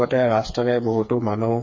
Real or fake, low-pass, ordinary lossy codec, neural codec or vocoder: fake; 7.2 kHz; MP3, 32 kbps; codec, 16 kHz, 4 kbps, FreqCodec, smaller model